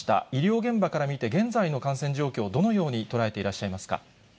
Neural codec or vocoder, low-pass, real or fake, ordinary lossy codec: none; none; real; none